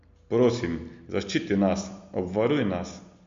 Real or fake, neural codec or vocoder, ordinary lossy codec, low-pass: real; none; MP3, 48 kbps; 7.2 kHz